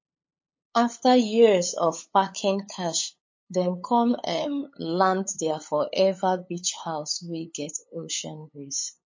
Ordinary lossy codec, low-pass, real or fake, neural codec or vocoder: MP3, 32 kbps; 7.2 kHz; fake; codec, 16 kHz, 8 kbps, FunCodec, trained on LibriTTS, 25 frames a second